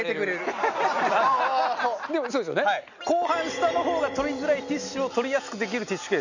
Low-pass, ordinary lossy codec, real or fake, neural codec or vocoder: 7.2 kHz; none; real; none